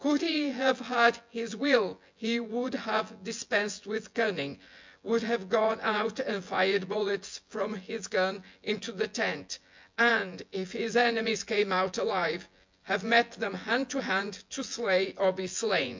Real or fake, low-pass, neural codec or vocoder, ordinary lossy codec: fake; 7.2 kHz; vocoder, 24 kHz, 100 mel bands, Vocos; MP3, 64 kbps